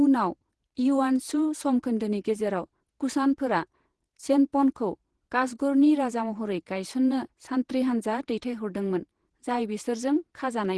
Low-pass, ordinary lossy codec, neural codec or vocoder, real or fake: 10.8 kHz; Opus, 16 kbps; vocoder, 48 kHz, 128 mel bands, Vocos; fake